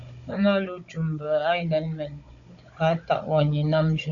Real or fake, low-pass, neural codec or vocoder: fake; 7.2 kHz; codec, 16 kHz, 16 kbps, FunCodec, trained on Chinese and English, 50 frames a second